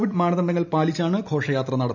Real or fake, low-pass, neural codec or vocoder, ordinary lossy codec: real; 7.2 kHz; none; none